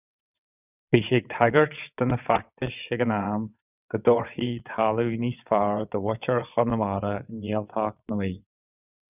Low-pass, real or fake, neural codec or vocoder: 3.6 kHz; fake; vocoder, 22.05 kHz, 80 mel bands, WaveNeXt